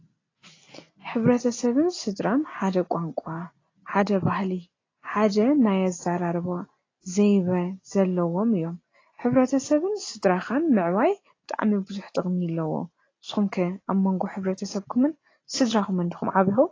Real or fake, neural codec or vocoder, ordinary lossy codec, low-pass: real; none; AAC, 32 kbps; 7.2 kHz